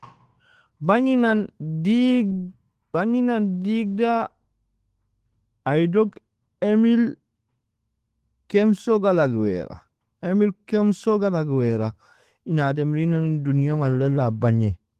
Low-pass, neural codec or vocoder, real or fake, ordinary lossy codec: 14.4 kHz; autoencoder, 48 kHz, 128 numbers a frame, DAC-VAE, trained on Japanese speech; fake; Opus, 16 kbps